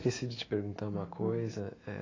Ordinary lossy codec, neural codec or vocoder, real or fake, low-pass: AAC, 32 kbps; autoencoder, 48 kHz, 128 numbers a frame, DAC-VAE, trained on Japanese speech; fake; 7.2 kHz